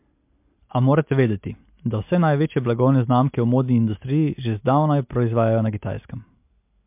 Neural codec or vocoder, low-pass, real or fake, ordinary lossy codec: none; 3.6 kHz; real; MP3, 32 kbps